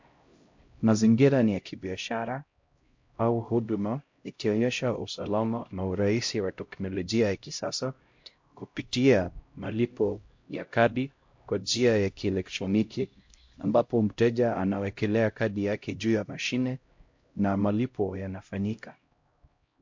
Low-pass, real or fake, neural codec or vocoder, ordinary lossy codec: 7.2 kHz; fake; codec, 16 kHz, 0.5 kbps, X-Codec, HuBERT features, trained on LibriSpeech; MP3, 48 kbps